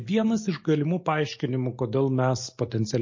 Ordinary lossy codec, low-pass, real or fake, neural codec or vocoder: MP3, 32 kbps; 7.2 kHz; real; none